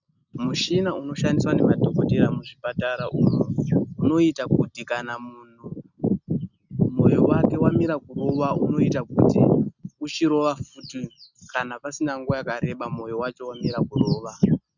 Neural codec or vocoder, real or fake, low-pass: none; real; 7.2 kHz